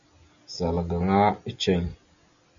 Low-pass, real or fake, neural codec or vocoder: 7.2 kHz; real; none